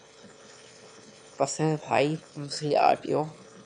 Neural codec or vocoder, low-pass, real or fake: autoencoder, 22.05 kHz, a latent of 192 numbers a frame, VITS, trained on one speaker; 9.9 kHz; fake